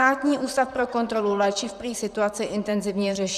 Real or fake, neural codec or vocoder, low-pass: fake; vocoder, 44.1 kHz, 128 mel bands, Pupu-Vocoder; 14.4 kHz